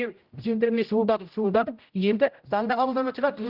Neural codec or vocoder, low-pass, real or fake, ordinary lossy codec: codec, 16 kHz, 0.5 kbps, X-Codec, HuBERT features, trained on general audio; 5.4 kHz; fake; Opus, 32 kbps